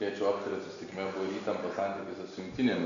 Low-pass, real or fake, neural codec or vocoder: 7.2 kHz; real; none